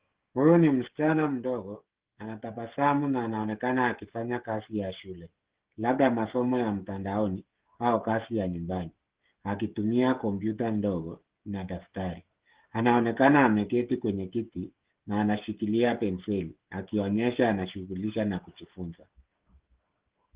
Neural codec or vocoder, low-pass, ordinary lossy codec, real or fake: codec, 16 kHz, 16 kbps, FreqCodec, smaller model; 3.6 kHz; Opus, 16 kbps; fake